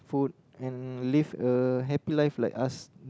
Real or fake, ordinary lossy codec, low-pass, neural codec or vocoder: real; none; none; none